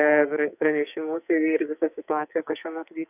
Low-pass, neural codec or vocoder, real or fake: 3.6 kHz; codec, 44.1 kHz, 2.6 kbps, SNAC; fake